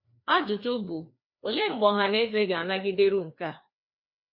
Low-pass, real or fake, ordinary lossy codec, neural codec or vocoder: 5.4 kHz; fake; MP3, 32 kbps; codec, 16 kHz, 2 kbps, FreqCodec, larger model